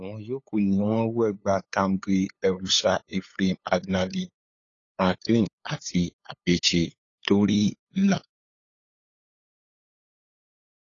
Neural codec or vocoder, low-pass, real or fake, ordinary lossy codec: codec, 16 kHz, 8 kbps, FunCodec, trained on LibriTTS, 25 frames a second; 7.2 kHz; fake; AAC, 32 kbps